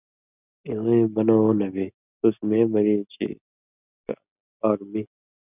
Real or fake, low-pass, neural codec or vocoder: real; 3.6 kHz; none